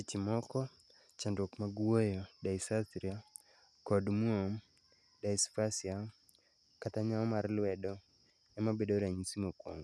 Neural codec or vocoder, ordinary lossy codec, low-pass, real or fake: none; none; none; real